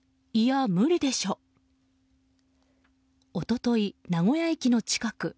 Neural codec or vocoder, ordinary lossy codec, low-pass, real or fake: none; none; none; real